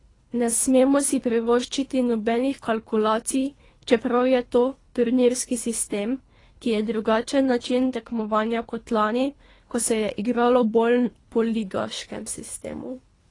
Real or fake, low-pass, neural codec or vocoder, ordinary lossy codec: fake; 10.8 kHz; codec, 24 kHz, 3 kbps, HILCodec; AAC, 32 kbps